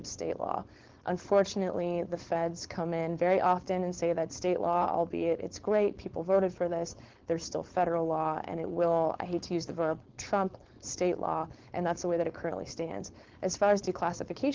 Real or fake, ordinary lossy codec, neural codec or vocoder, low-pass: fake; Opus, 16 kbps; codec, 16 kHz, 4.8 kbps, FACodec; 7.2 kHz